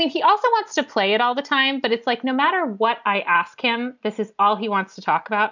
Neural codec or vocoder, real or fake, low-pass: none; real; 7.2 kHz